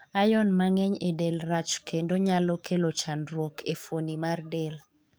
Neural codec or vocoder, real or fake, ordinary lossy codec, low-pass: codec, 44.1 kHz, 7.8 kbps, DAC; fake; none; none